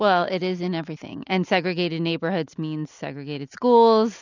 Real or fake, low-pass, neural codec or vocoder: real; 7.2 kHz; none